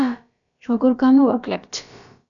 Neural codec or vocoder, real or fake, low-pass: codec, 16 kHz, about 1 kbps, DyCAST, with the encoder's durations; fake; 7.2 kHz